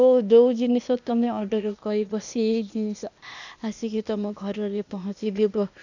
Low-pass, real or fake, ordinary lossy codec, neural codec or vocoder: 7.2 kHz; fake; none; codec, 16 kHz, 0.8 kbps, ZipCodec